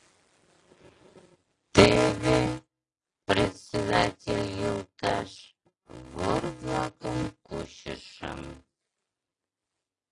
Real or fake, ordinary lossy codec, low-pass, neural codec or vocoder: real; AAC, 32 kbps; 10.8 kHz; none